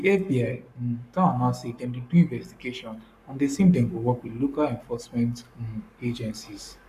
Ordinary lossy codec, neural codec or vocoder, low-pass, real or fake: none; codec, 44.1 kHz, 7.8 kbps, Pupu-Codec; 14.4 kHz; fake